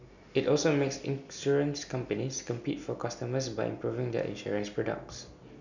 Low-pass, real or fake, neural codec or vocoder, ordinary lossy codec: 7.2 kHz; real; none; none